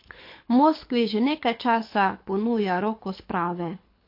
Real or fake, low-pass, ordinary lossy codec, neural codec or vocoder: fake; 5.4 kHz; MP3, 32 kbps; codec, 24 kHz, 6 kbps, HILCodec